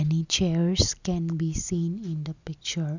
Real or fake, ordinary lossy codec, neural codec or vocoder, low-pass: real; none; none; 7.2 kHz